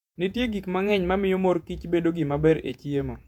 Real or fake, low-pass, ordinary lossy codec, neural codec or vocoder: fake; 19.8 kHz; none; vocoder, 48 kHz, 128 mel bands, Vocos